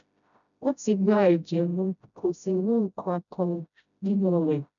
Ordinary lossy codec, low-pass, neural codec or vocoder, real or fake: none; 7.2 kHz; codec, 16 kHz, 0.5 kbps, FreqCodec, smaller model; fake